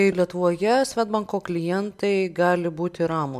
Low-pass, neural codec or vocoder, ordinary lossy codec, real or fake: 14.4 kHz; none; MP3, 96 kbps; real